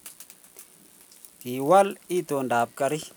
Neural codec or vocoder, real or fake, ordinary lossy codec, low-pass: none; real; none; none